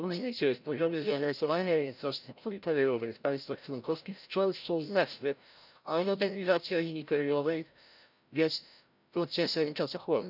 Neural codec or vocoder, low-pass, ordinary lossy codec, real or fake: codec, 16 kHz, 0.5 kbps, FreqCodec, larger model; 5.4 kHz; none; fake